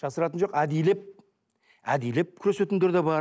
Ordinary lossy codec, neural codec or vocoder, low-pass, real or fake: none; none; none; real